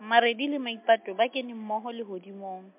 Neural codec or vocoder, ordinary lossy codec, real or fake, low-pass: none; MP3, 32 kbps; real; 3.6 kHz